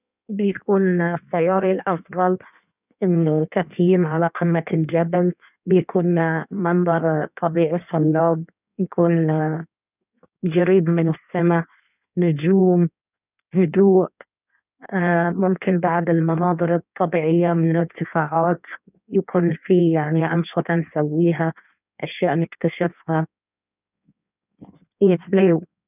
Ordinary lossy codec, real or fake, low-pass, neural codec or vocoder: none; fake; 3.6 kHz; codec, 16 kHz in and 24 kHz out, 1.1 kbps, FireRedTTS-2 codec